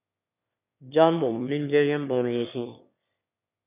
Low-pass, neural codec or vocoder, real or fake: 3.6 kHz; autoencoder, 22.05 kHz, a latent of 192 numbers a frame, VITS, trained on one speaker; fake